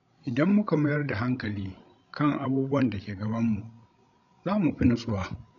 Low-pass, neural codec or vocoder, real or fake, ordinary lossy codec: 7.2 kHz; codec, 16 kHz, 16 kbps, FreqCodec, larger model; fake; none